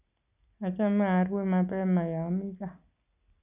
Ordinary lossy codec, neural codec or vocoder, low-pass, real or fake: none; none; 3.6 kHz; real